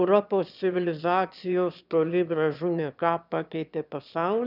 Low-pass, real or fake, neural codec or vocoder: 5.4 kHz; fake; autoencoder, 22.05 kHz, a latent of 192 numbers a frame, VITS, trained on one speaker